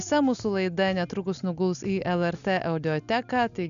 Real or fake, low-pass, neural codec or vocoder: real; 7.2 kHz; none